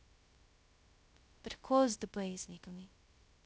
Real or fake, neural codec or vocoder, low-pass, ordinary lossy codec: fake; codec, 16 kHz, 0.2 kbps, FocalCodec; none; none